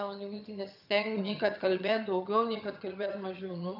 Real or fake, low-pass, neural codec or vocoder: fake; 5.4 kHz; vocoder, 22.05 kHz, 80 mel bands, HiFi-GAN